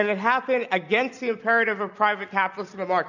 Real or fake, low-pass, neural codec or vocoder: real; 7.2 kHz; none